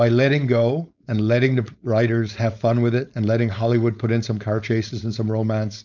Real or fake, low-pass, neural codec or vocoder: fake; 7.2 kHz; codec, 16 kHz, 4.8 kbps, FACodec